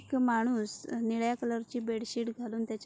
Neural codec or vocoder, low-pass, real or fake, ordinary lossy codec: none; none; real; none